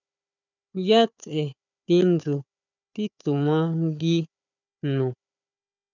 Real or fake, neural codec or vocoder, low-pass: fake; codec, 16 kHz, 4 kbps, FunCodec, trained on Chinese and English, 50 frames a second; 7.2 kHz